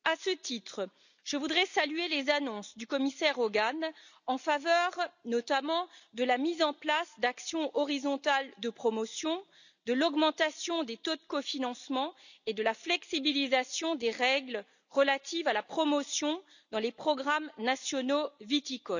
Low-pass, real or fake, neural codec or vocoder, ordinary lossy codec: 7.2 kHz; real; none; none